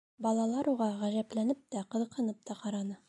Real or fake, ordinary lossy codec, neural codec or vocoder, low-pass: real; MP3, 96 kbps; none; 10.8 kHz